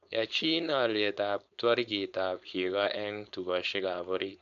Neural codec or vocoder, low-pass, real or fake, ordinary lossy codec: codec, 16 kHz, 4.8 kbps, FACodec; 7.2 kHz; fake; none